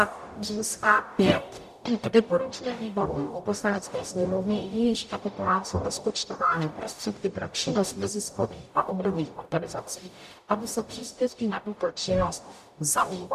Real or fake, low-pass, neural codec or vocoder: fake; 14.4 kHz; codec, 44.1 kHz, 0.9 kbps, DAC